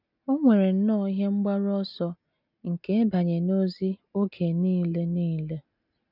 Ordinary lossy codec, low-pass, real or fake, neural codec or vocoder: none; 5.4 kHz; real; none